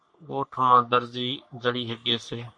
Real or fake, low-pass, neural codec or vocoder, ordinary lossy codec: fake; 9.9 kHz; codec, 44.1 kHz, 3.4 kbps, Pupu-Codec; MP3, 48 kbps